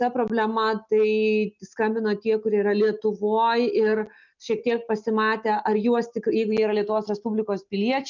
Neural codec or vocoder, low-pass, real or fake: none; 7.2 kHz; real